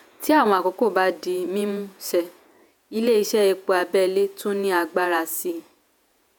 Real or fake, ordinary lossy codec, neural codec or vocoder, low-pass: fake; none; vocoder, 48 kHz, 128 mel bands, Vocos; none